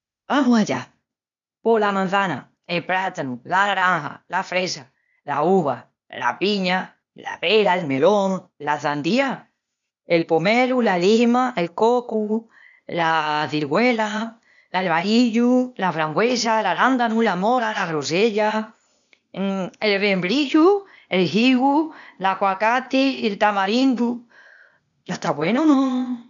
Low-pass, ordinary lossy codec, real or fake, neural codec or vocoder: 7.2 kHz; none; fake; codec, 16 kHz, 0.8 kbps, ZipCodec